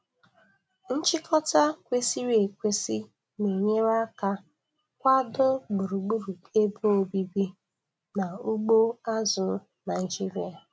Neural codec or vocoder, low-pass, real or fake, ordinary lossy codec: none; none; real; none